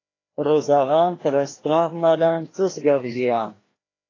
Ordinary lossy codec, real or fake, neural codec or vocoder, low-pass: AAC, 32 kbps; fake; codec, 16 kHz, 1 kbps, FreqCodec, larger model; 7.2 kHz